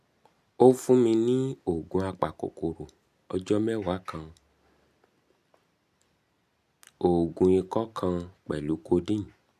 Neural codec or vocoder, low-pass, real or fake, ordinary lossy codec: none; 14.4 kHz; real; none